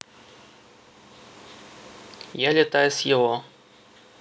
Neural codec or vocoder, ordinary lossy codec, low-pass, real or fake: none; none; none; real